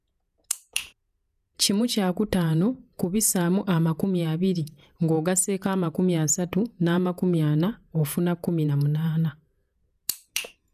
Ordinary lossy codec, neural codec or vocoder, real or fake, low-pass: none; vocoder, 48 kHz, 128 mel bands, Vocos; fake; 14.4 kHz